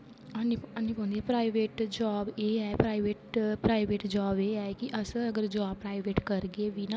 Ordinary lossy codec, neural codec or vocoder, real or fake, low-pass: none; none; real; none